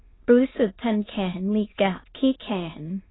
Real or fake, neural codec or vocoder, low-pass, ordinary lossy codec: fake; autoencoder, 22.05 kHz, a latent of 192 numbers a frame, VITS, trained on many speakers; 7.2 kHz; AAC, 16 kbps